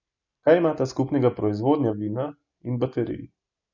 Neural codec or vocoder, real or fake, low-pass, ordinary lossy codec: none; real; 7.2 kHz; none